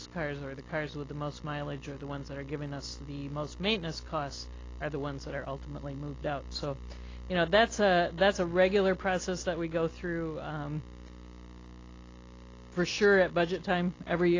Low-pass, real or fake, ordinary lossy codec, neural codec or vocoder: 7.2 kHz; real; AAC, 32 kbps; none